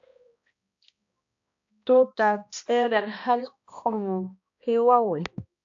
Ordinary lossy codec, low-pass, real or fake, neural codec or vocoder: AAC, 48 kbps; 7.2 kHz; fake; codec, 16 kHz, 1 kbps, X-Codec, HuBERT features, trained on balanced general audio